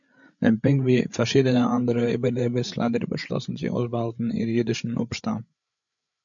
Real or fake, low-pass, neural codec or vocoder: fake; 7.2 kHz; codec, 16 kHz, 8 kbps, FreqCodec, larger model